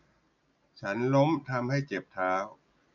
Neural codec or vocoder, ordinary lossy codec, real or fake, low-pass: none; none; real; 7.2 kHz